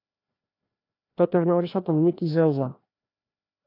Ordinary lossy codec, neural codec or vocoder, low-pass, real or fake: AAC, 32 kbps; codec, 16 kHz, 1 kbps, FreqCodec, larger model; 5.4 kHz; fake